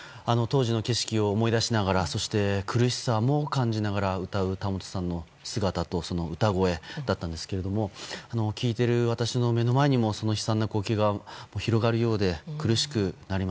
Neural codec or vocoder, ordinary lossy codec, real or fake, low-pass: none; none; real; none